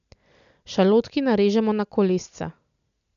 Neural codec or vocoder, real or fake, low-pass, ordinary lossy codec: none; real; 7.2 kHz; none